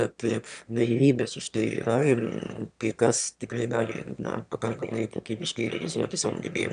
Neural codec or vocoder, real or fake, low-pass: autoencoder, 22.05 kHz, a latent of 192 numbers a frame, VITS, trained on one speaker; fake; 9.9 kHz